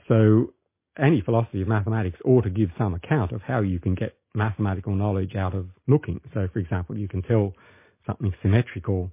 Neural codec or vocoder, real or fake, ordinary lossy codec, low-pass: none; real; MP3, 24 kbps; 3.6 kHz